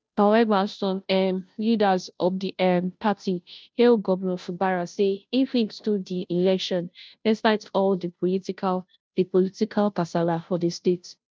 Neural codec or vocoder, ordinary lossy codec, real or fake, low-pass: codec, 16 kHz, 0.5 kbps, FunCodec, trained on Chinese and English, 25 frames a second; none; fake; none